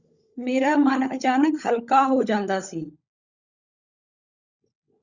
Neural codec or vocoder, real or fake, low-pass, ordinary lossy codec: codec, 16 kHz, 16 kbps, FunCodec, trained on LibriTTS, 50 frames a second; fake; 7.2 kHz; Opus, 64 kbps